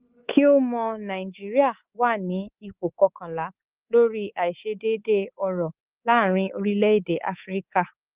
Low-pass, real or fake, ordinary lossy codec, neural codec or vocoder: 3.6 kHz; fake; Opus, 32 kbps; codec, 24 kHz, 3.1 kbps, DualCodec